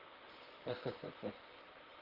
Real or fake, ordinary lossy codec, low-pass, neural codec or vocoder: fake; Opus, 16 kbps; 5.4 kHz; vocoder, 44.1 kHz, 128 mel bands, Pupu-Vocoder